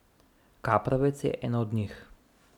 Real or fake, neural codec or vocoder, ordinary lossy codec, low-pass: real; none; none; 19.8 kHz